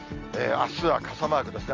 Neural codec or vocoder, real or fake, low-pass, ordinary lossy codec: none; real; 7.2 kHz; Opus, 32 kbps